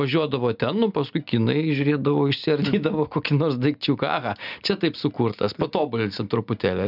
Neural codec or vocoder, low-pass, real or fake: none; 5.4 kHz; real